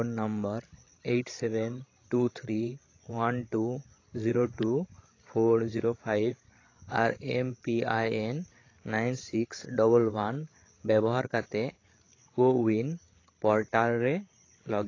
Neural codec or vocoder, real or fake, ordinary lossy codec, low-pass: codec, 16 kHz, 16 kbps, FreqCodec, larger model; fake; AAC, 32 kbps; 7.2 kHz